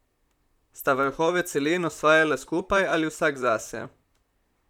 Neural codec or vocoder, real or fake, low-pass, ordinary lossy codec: vocoder, 44.1 kHz, 128 mel bands, Pupu-Vocoder; fake; 19.8 kHz; none